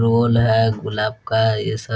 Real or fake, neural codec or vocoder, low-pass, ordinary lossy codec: real; none; none; none